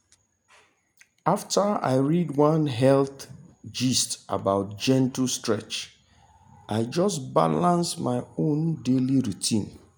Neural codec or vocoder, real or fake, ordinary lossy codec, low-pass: none; real; none; none